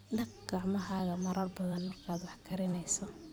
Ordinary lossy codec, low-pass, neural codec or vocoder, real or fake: none; none; vocoder, 44.1 kHz, 128 mel bands every 256 samples, BigVGAN v2; fake